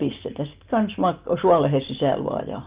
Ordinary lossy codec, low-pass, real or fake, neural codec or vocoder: Opus, 32 kbps; 3.6 kHz; real; none